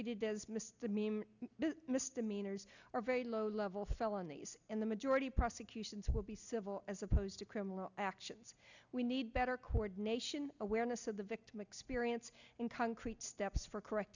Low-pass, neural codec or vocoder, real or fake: 7.2 kHz; none; real